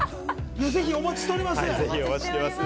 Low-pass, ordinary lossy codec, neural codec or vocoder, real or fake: none; none; none; real